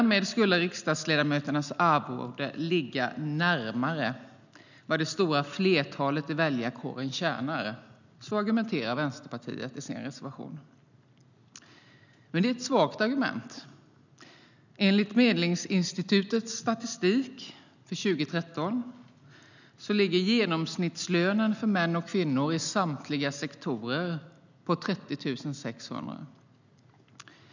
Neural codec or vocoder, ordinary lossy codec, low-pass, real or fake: none; none; 7.2 kHz; real